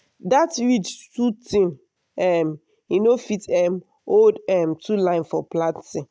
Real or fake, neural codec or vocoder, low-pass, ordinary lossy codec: real; none; none; none